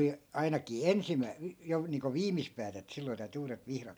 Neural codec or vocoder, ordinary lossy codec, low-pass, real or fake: none; none; none; real